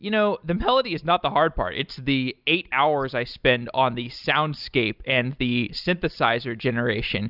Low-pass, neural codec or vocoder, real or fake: 5.4 kHz; none; real